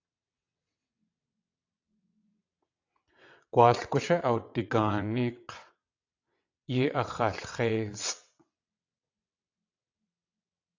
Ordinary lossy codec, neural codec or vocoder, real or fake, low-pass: AAC, 48 kbps; vocoder, 22.05 kHz, 80 mel bands, WaveNeXt; fake; 7.2 kHz